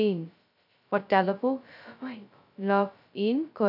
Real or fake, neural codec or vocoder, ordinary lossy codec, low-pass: fake; codec, 16 kHz, 0.2 kbps, FocalCodec; none; 5.4 kHz